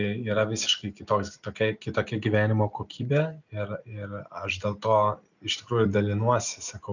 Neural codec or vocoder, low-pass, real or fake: none; 7.2 kHz; real